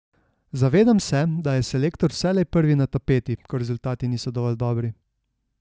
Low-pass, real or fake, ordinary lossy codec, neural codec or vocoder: none; real; none; none